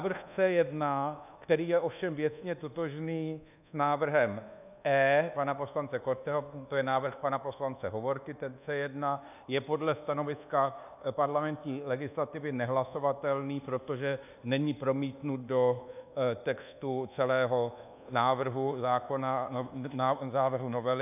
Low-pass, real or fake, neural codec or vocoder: 3.6 kHz; fake; codec, 24 kHz, 1.2 kbps, DualCodec